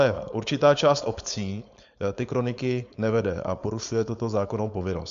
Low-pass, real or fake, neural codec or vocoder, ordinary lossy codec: 7.2 kHz; fake; codec, 16 kHz, 4.8 kbps, FACodec; MP3, 64 kbps